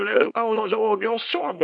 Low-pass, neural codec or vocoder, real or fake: 9.9 kHz; codec, 24 kHz, 0.9 kbps, WavTokenizer, small release; fake